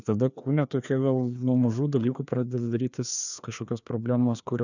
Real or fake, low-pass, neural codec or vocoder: fake; 7.2 kHz; codec, 16 kHz, 2 kbps, FreqCodec, larger model